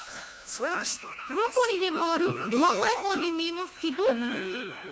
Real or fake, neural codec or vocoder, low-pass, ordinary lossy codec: fake; codec, 16 kHz, 1 kbps, FunCodec, trained on LibriTTS, 50 frames a second; none; none